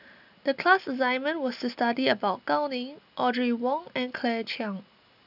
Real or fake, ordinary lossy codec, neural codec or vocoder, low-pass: real; none; none; 5.4 kHz